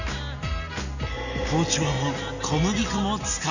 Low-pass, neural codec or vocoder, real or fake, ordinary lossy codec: 7.2 kHz; none; real; none